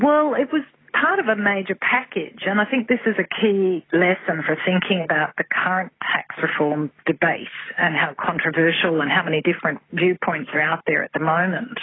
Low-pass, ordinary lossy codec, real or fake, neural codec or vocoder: 7.2 kHz; AAC, 16 kbps; real; none